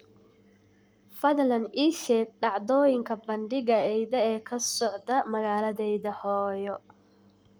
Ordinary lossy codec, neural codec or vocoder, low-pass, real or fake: none; codec, 44.1 kHz, 7.8 kbps, Pupu-Codec; none; fake